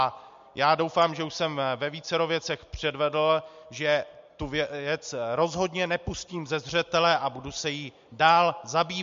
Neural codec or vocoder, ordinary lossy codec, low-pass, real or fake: none; MP3, 48 kbps; 7.2 kHz; real